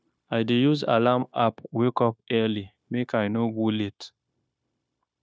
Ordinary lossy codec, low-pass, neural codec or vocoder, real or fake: none; none; codec, 16 kHz, 0.9 kbps, LongCat-Audio-Codec; fake